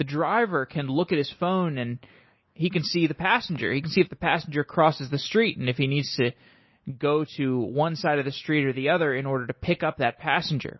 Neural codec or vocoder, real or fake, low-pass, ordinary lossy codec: none; real; 7.2 kHz; MP3, 24 kbps